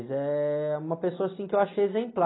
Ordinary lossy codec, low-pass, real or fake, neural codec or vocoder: AAC, 16 kbps; 7.2 kHz; real; none